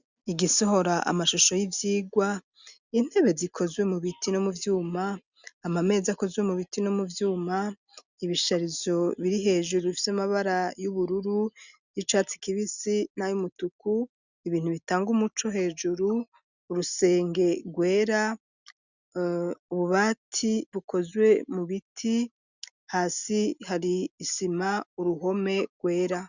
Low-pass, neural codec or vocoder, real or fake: 7.2 kHz; none; real